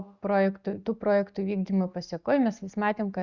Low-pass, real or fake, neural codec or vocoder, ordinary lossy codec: 7.2 kHz; real; none; Opus, 64 kbps